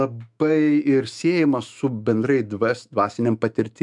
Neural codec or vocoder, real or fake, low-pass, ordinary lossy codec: autoencoder, 48 kHz, 128 numbers a frame, DAC-VAE, trained on Japanese speech; fake; 10.8 kHz; MP3, 96 kbps